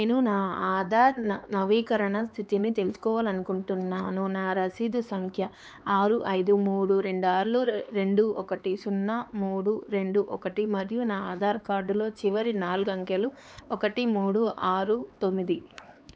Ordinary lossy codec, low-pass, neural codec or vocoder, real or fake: none; none; codec, 16 kHz, 4 kbps, X-Codec, HuBERT features, trained on LibriSpeech; fake